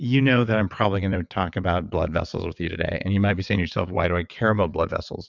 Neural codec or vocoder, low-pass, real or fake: vocoder, 22.05 kHz, 80 mel bands, WaveNeXt; 7.2 kHz; fake